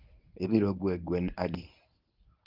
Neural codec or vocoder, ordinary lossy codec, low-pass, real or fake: codec, 24 kHz, 0.9 kbps, WavTokenizer, medium speech release version 1; Opus, 32 kbps; 5.4 kHz; fake